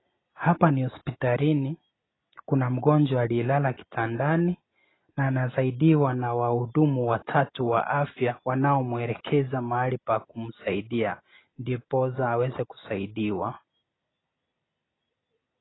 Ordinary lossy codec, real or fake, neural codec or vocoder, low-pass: AAC, 16 kbps; real; none; 7.2 kHz